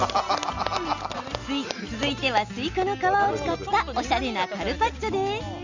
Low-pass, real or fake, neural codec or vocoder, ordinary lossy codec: 7.2 kHz; real; none; Opus, 64 kbps